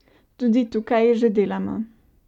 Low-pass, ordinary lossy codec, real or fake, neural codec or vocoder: 19.8 kHz; none; real; none